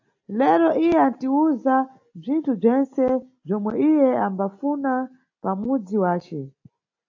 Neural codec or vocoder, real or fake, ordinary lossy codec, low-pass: none; real; AAC, 48 kbps; 7.2 kHz